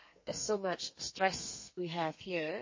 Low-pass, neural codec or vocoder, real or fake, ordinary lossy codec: 7.2 kHz; codec, 32 kHz, 1.9 kbps, SNAC; fake; MP3, 32 kbps